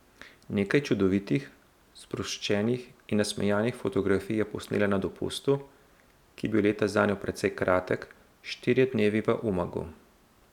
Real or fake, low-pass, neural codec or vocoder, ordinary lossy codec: real; 19.8 kHz; none; none